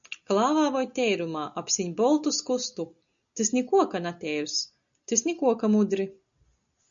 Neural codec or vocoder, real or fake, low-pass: none; real; 7.2 kHz